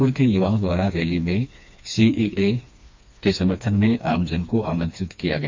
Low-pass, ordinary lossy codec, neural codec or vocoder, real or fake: 7.2 kHz; MP3, 48 kbps; codec, 16 kHz, 2 kbps, FreqCodec, smaller model; fake